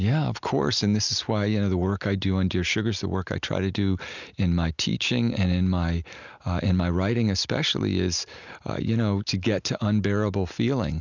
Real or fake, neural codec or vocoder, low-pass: real; none; 7.2 kHz